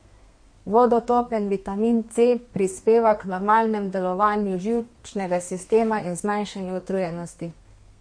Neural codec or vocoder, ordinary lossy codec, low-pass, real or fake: codec, 32 kHz, 1.9 kbps, SNAC; MP3, 48 kbps; 9.9 kHz; fake